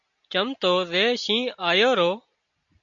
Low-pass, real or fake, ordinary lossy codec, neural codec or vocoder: 7.2 kHz; real; MP3, 64 kbps; none